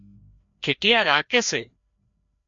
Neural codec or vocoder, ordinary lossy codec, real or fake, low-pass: codec, 16 kHz, 1 kbps, FreqCodec, larger model; MP3, 48 kbps; fake; 7.2 kHz